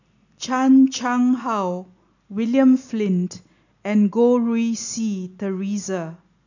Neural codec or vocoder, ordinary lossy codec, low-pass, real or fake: none; none; 7.2 kHz; real